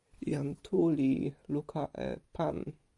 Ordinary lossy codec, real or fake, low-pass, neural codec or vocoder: MP3, 96 kbps; real; 10.8 kHz; none